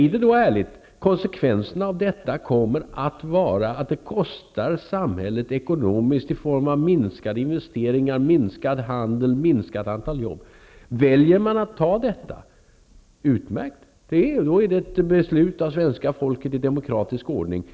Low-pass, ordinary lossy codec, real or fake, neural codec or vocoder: none; none; real; none